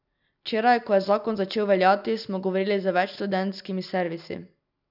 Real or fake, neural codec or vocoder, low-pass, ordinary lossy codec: real; none; 5.4 kHz; none